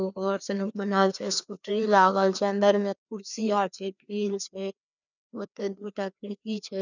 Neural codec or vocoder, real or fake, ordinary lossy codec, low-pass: codec, 16 kHz, 2 kbps, FreqCodec, larger model; fake; none; 7.2 kHz